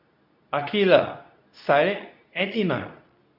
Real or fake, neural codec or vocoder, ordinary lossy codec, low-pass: fake; codec, 24 kHz, 0.9 kbps, WavTokenizer, medium speech release version 2; none; 5.4 kHz